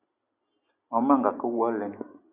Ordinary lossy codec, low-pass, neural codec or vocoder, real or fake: Opus, 64 kbps; 3.6 kHz; none; real